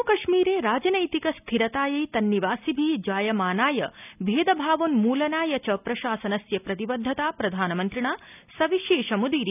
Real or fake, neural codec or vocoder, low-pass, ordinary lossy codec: real; none; 3.6 kHz; none